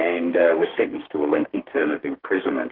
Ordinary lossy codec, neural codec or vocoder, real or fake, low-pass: Opus, 16 kbps; codec, 32 kHz, 1.9 kbps, SNAC; fake; 5.4 kHz